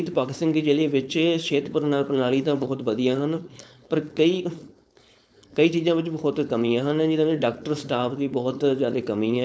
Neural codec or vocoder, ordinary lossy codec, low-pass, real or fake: codec, 16 kHz, 4.8 kbps, FACodec; none; none; fake